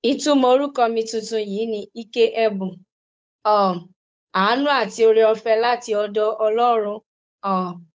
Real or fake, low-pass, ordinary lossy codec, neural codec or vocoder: fake; none; none; codec, 16 kHz, 8 kbps, FunCodec, trained on Chinese and English, 25 frames a second